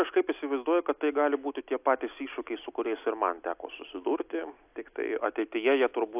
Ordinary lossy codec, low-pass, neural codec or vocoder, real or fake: AAC, 32 kbps; 3.6 kHz; none; real